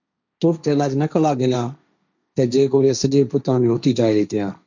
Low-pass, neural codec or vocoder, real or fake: 7.2 kHz; codec, 16 kHz, 1.1 kbps, Voila-Tokenizer; fake